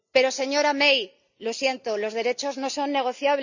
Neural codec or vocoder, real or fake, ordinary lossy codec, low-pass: none; real; none; 7.2 kHz